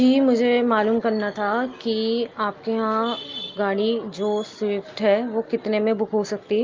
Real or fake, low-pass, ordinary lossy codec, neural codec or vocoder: real; 7.2 kHz; Opus, 32 kbps; none